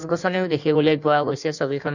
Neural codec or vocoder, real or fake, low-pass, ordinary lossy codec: codec, 16 kHz in and 24 kHz out, 1.1 kbps, FireRedTTS-2 codec; fake; 7.2 kHz; none